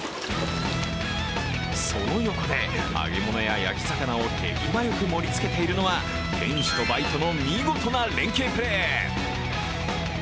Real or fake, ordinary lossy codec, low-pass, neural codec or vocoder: real; none; none; none